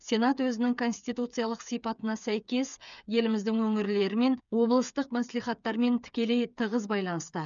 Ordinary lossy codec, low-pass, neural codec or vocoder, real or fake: none; 7.2 kHz; codec, 16 kHz, 8 kbps, FreqCodec, smaller model; fake